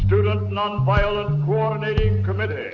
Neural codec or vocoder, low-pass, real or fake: none; 7.2 kHz; real